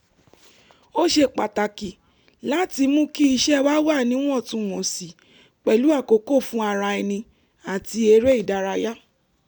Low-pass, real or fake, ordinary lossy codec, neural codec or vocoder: none; real; none; none